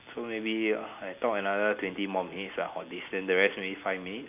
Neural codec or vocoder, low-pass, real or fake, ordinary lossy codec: none; 3.6 kHz; real; none